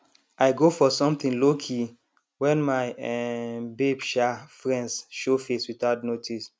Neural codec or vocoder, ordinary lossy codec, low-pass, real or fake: none; none; none; real